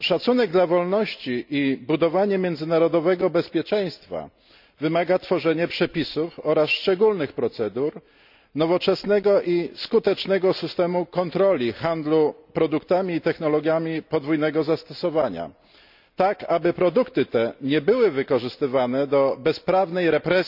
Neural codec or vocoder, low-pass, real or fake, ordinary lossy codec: none; 5.4 kHz; real; none